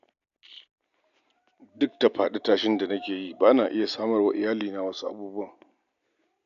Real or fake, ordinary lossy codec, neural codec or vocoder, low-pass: real; none; none; 7.2 kHz